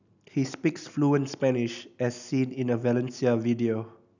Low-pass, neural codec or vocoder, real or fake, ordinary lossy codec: 7.2 kHz; none; real; none